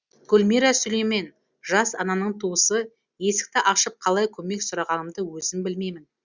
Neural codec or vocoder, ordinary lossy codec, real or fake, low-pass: none; Opus, 64 kbps; real; 7.2 kHz